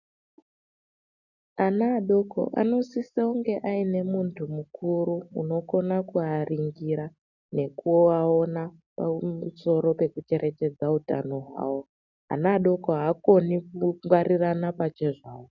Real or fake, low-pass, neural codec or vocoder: real; 7.2 kHz; none